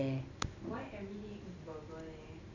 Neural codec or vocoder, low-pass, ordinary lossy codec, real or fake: none; 7.2 kHz; none; real